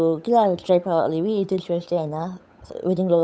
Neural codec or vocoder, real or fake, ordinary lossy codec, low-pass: codec, 16 kHz, 8 kbps, FunCodec, trained on Chinese and English, 25 frames a second; fake; none; none